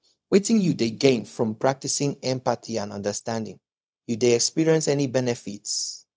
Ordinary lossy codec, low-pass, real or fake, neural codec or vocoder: none; none; fake; codec, 16 kHz, 0.4 kbps, LongCat-Audio-Codec